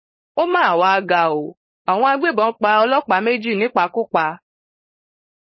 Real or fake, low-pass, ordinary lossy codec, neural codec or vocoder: fake; 7.2 kHz; MP3, 24 kbps; codec, 16 kHz, 4.8 kbps, FACodec